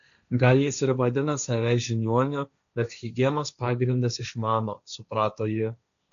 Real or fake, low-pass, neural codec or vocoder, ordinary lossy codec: fake; 7.2 kHz; codec, 16 kHz, 1.1 kbps, Voila-Tokenizer; MP3, 96 kbps